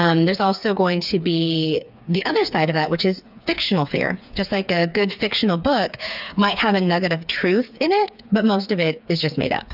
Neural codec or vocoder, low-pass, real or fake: codec, 16 kHz, 4 kbps, FreqCodec, smaller model; 5.4 kHz; fake